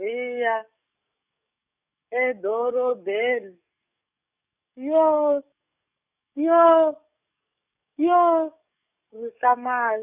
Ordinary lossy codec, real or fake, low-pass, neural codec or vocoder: none; fake; 3.6 kHz; codec, 44.1 kHz, 7.8 kbps, DAC